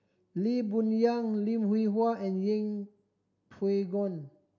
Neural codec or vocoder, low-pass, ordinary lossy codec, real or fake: none; 7.2 kHz; none; real